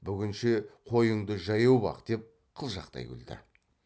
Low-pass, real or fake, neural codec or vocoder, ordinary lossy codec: none; real; none; none